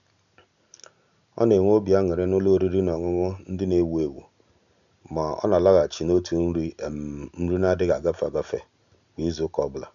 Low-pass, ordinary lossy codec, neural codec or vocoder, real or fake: 7.2 kHz; none; none; real